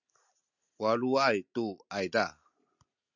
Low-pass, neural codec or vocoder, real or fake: 7.2 kHz; none; real